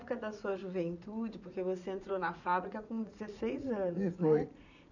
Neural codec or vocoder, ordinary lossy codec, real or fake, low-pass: vocoder, 44.1 kHz, 80 mel bands, Vocos; none; fake; 7.2 kHz